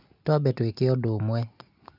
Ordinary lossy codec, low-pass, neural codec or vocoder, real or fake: none; 5.4 kHz; none; real